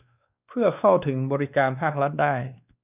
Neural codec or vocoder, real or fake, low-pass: codec, 16 kHz, 2 kbps, X-Codec, WavLM features, trained on Multilingual LibriSpeech; fake; 3.6 kHz